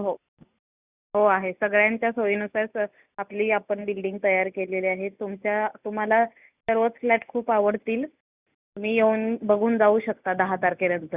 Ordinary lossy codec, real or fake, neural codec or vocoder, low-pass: Opus, 64 kbps; real; none; 3.6 kHz